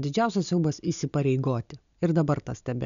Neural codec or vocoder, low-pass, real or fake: none; 7.2 kHz; real